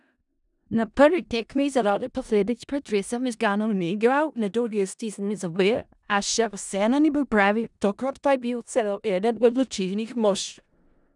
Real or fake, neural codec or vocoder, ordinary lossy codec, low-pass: fake; codec, 16 kHz in and 24 kHz out, 0.4 kbps, LongCat-Audio-Codec, four codebook decoder; none; 10.8 kHz